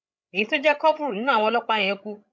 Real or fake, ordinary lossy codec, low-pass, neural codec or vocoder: fake; none; none; codec, 16 kHz, 16 kbps, FreqCodec, larger model